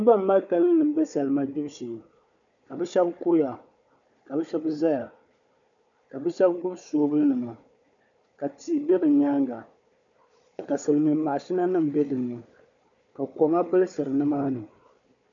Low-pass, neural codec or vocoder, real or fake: 7.2 kHz; codec, 16 kHz, 4 kbps, FunCodec, trained on Chinese and English, 50 frames a second; fake